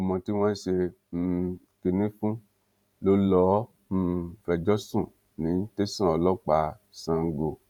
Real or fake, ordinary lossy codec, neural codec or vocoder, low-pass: fake; none; vocoder, 44.1 kHz, 128 mel bands every 512 samples, BigVGAN v2; 19.8 kHz